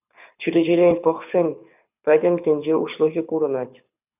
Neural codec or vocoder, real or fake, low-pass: codec, 24 kHz, 6 kbps, HILCodec; fake; 3.6 kHz